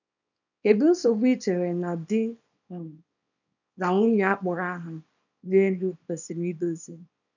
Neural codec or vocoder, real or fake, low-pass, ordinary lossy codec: codec, 24 kHz, 0.9 kbps, WavTokenizer, small release; fake; 7.2 kHz; none